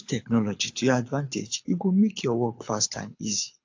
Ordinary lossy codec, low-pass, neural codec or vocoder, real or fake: AAC, 48 kbps; 7.2 kHz; codec, 24 kHz, 6 kbps, HILCodec; fake